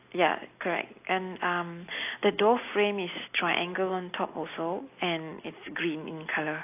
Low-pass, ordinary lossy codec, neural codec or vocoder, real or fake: 3.6 kHz; AAC, 24 kbps; none; real